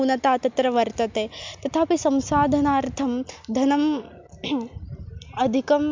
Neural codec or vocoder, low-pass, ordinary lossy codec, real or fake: none; 7.2 kHz; MP3, 64 kbps; real